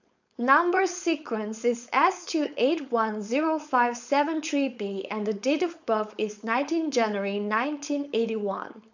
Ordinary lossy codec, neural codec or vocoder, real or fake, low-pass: none; codec, 16 kHz, 4.8 kbps, FACodec; fake; 7.2 kHz